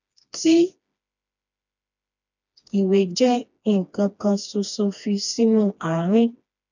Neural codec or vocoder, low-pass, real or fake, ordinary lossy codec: codec, 16 kHz, 2 kbps, FreqCodec, smaller model; 7.2 kHz; fake; none